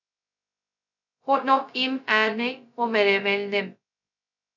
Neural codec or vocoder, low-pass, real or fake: codec, 16 kHz, 0.2 kbps, FocalCodec; 7.2 kHz; fake